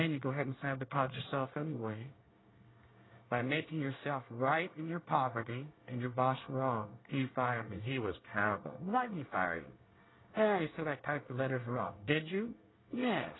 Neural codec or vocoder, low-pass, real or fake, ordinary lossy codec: codec, 24 kHz, 1 kbps, SNAC; 7.2 kHz; fake; AAC, 16 kbps